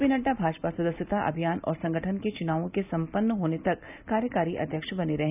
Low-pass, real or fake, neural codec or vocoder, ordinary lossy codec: 3.6 kHz; real; none; none